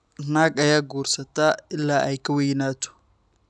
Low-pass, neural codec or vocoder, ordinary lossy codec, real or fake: none; none; none; real